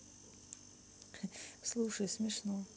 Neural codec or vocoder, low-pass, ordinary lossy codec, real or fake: none; none; none; real